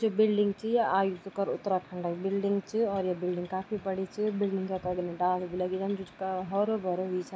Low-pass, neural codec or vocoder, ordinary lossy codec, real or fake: none; none; none; real